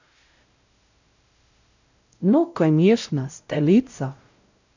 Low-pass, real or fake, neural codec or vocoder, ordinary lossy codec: 7.2 kHz; fake; codec, 16 kHz, 0.5 kbps, X-Codec, WavLM features, trained on Multilingual LibriSpeech; none